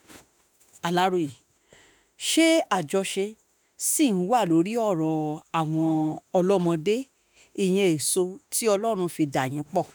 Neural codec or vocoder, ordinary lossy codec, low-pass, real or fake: autoencoder, 48 kHz, 32 numbers a frame, DAC-VAE, trained on Japanese speech; none; none; fake